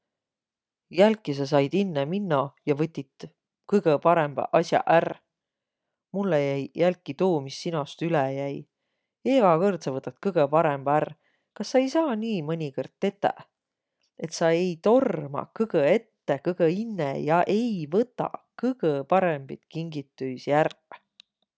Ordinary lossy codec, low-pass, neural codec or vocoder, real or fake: none; none; none; real